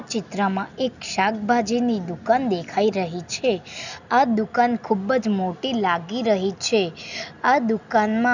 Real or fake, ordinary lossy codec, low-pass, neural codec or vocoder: real; none; 7.2 kHz; none